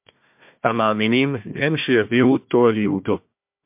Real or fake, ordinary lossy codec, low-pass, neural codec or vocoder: fake; MP3, 32 kbps; 3.6 kHz; codec, 16 kHz, 1 kbps, FunCodec, trained on Chinese and English, 50 frames a second